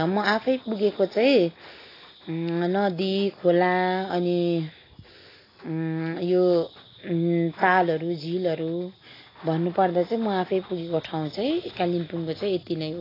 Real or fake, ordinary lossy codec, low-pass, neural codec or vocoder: real; AAC, 24 kbps; 5.4 kHz; none